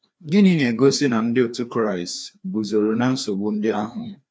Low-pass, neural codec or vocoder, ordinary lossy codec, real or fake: none; codec, 16 kHz, 2 kbps, FreqCodec, larger model; none; fake